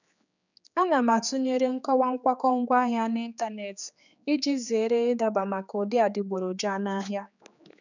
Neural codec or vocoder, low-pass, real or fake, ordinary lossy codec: codec, 16 kHz, 4 kbps, X-Codec, HuBERT features, trained on general audio; 7.2 kHz; fake; none